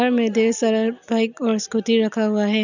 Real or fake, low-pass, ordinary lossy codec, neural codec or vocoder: real; 7.2 kHz; none; none